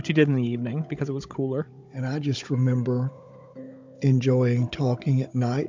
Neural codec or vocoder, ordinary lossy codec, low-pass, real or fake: codec, 16 kHz, 16 kbps, FunCodec, trained on Chinese and English, 50 frames a second; MP3, 64 kbps; 7.2 kHz; fake